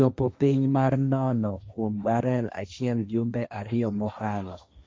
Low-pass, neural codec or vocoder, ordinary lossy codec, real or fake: none; codec, 16 kHz, 1.1 kbps, Voila-Tokenizer; none; fake